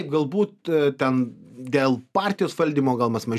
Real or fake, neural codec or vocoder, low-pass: real; none; 14.4 kHz